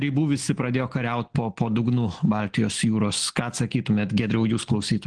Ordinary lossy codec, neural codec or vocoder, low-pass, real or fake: Opus, 16 kbps; none; 9.9 kHz; real